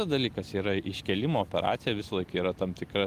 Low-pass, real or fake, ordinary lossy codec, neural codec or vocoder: 14.4 kHz; real; Opus, 24 kbps; none